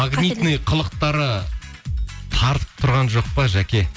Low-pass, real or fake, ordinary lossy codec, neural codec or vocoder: none; real; none; none